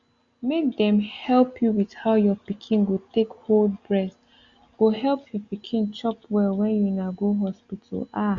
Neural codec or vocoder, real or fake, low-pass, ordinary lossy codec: none; real; 7.2 kHz; Opus, 64 kbps